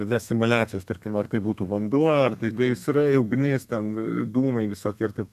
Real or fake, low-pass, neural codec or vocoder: fake; 14.4 kHz; codec, 32 kHz, 1.9 kbps, SNAC